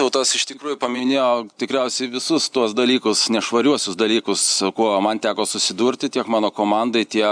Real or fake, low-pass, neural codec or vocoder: real; 9.9 kHz; none